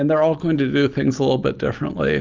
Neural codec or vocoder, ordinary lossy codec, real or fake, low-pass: none; Opus, 24 kbps; real; 7.2 kHz